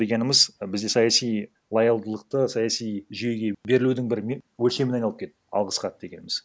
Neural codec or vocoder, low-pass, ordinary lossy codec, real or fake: none; none; none; real